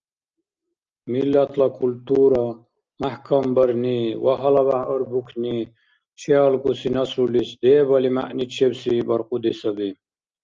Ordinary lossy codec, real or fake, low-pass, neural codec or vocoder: Opus, 24 kbps; real; 7.2 kHz; none